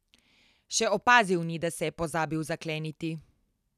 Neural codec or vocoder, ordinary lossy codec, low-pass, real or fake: none; none; 14.4 kHz; real